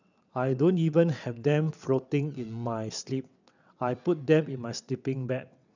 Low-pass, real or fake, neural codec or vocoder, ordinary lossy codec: 7.2 kHz; fake; vocoder, 22.05 kHz, 80 mel bands, WaveNeXt; none